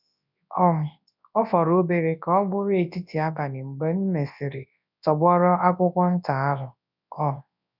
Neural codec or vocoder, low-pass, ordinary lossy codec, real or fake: codec, 24 kHz, 0.9 kbps, WavTokenizer, large speech release; 5.4 kHz; none; fake